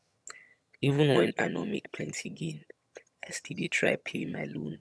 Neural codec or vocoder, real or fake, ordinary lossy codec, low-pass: vocoder, 22.05 kHz, 80 mel bands, HiFi-GAN; fake; none; none